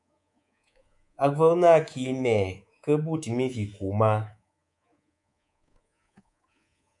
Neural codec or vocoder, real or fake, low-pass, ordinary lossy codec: codec, 24 kHz, 3.1 kbps, DualCodec; fake; 10.8 kHz; MP3, 96 kbps